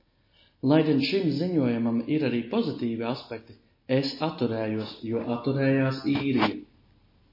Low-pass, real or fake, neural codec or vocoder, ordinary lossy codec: 5.4 kHz; real; none; MP3, 24 kbps